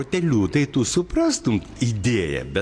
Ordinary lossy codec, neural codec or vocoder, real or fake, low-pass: AAC, 48 kbps; none; real; 9.9 kHz